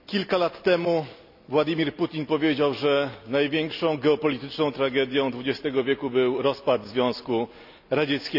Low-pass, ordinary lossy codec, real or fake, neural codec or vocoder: 5.4 kHz; none; real; none